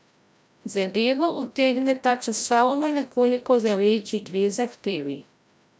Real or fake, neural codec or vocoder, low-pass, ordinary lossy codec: fake; codec, 16 kHz, 0.5 kbps, FreqCodec, larger model; none; none